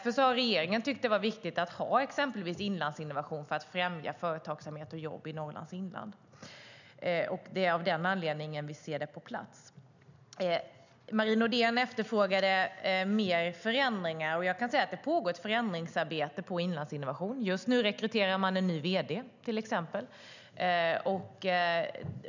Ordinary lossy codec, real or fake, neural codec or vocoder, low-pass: none; real; none; 7.2 kHz